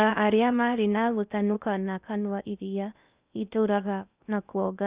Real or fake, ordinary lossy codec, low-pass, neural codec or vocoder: fake; Opus, 64 kbps; 3.6 kHz; codec, 16 kHz in and 24 kHz out, 0.6 kbps, FocalCodec, streaming, 2048 codes